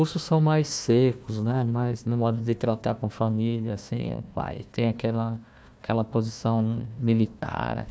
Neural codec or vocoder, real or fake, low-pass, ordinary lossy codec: codec, 16 kHz, 1 kbps, FunCodec, trained on Chinese and English, 50 frames a second; fake; none; none